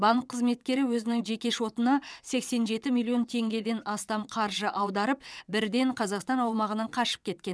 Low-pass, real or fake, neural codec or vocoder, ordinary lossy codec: none; fake; vocoder, 22.05 kHz, 80 mel bands, WaveNeXt; none